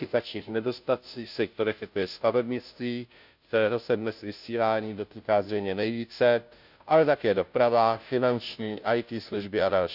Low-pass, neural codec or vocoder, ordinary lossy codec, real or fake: 5.4 kHz; codec, 16 kHz, 0.5 kbps, FunCodec, trained on Chinese and English, 25 frames a second; none; fake